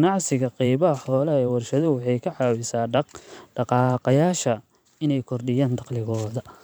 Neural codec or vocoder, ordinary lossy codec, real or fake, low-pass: vocoder, 44.1 kHz, 128 mel bands every 512 samples, BigVGAN v2; none; fake; none